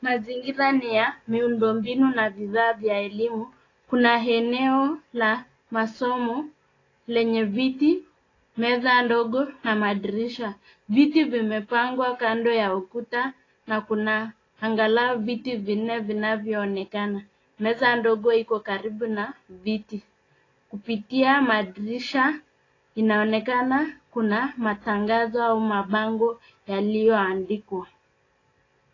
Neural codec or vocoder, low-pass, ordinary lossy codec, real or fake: none; 7.2 kHz; AAC, 32 kbps; real